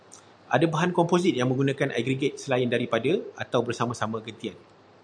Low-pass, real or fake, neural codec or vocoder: 9.9 kHz; real; none